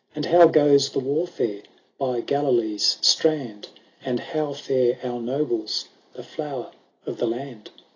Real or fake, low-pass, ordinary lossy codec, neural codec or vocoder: real; 7.2 kHz; AAC, 32 kbps; none